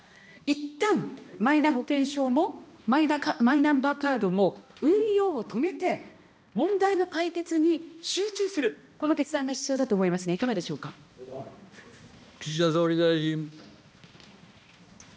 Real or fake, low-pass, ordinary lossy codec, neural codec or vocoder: fake; none; none; codec, 16 kHz, 1 kbps, X-Codec, HuBERT features, trained on balanced general audio